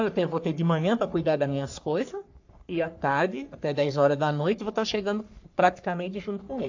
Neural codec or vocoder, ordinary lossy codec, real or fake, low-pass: codec, 44.1 kHz, 3.4 kbps, Pupu-Codec; AAC, 48 kbps; fake; 7.2 kHz